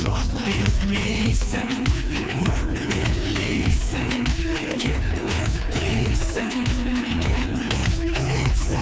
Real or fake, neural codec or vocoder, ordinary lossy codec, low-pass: fake; codec, 16 kHz, 2 kbps, FreqCodec, larger model; none; none